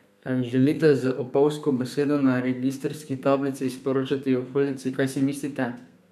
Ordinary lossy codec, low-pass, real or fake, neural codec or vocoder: none; 14.4 kHz; fake; codec, 32 kHz, 1.9 kbps, SNAC